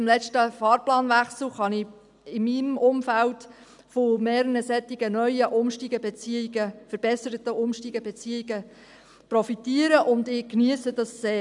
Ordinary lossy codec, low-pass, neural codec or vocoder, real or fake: none; 10.8 kHz; none; real